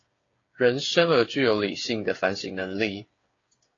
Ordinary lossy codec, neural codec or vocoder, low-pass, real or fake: AAC, 32 kbps; codec, 16 kHz, 8 kbps, FreqCodec, smaller model; 7.2 kHz; fake